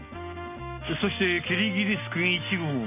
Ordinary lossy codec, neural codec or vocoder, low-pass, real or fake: none; none; 3.6 kHz; real